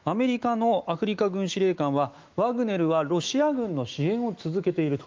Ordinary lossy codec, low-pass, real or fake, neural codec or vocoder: Opus, 24 kbps; 7.2 kHz; fake; autoencoder, 48 kHz, 128 numbers a frame, DAC-VAE, trained on Japanese speech